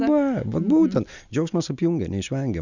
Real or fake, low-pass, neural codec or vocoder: real; 7.2 kHz; none